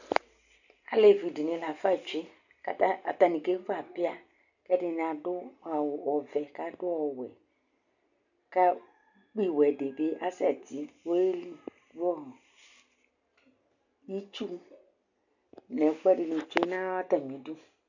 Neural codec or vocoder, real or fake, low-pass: none; real; 7.2 kHz